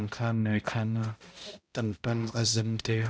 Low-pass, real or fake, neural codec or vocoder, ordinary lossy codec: none; fake; codec, 16 kHz, 0.5 kbps, X-Codec, HuBERT features, trained on balanced general audio; none